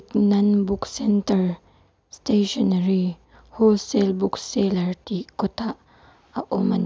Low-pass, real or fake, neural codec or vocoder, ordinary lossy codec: none; real; none; none